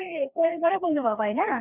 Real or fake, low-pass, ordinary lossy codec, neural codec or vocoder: fake; 3.6 kHz; none; codec, 16 kHz, 2 kbps, FreqCodec, larger model